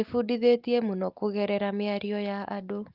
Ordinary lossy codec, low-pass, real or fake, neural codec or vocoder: Opus, 32 kbps; 5.4 kHz; real; none